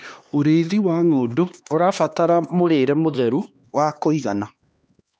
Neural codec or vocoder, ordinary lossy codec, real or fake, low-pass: codec, 16 kHz, 2 kbps, X-Codec, HuBERT features, trained on LibriSpeech; none; fake; none